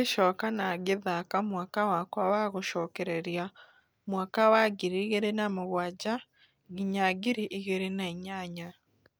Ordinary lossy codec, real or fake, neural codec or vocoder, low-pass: none; real; none; none